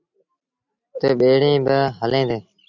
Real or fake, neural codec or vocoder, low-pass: real; none; 7.2 kHz